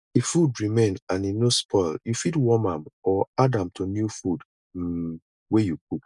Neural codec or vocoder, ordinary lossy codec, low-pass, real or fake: none; none; 10.8 kHz; real